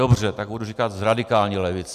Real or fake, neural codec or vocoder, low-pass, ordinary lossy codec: real; none; 14.4 kHz; MP3, 96 kbps